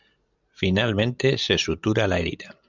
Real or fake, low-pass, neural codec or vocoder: real; 7.2 kHz; none